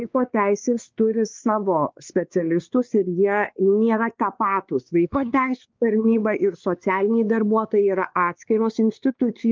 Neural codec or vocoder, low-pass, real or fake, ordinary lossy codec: codec, 16 kHz, 2 kbps, X-Codec, WavLM features, trained on Multilingual LibriSpeech; 7.2 kHz; fake; Opus, 24 kbps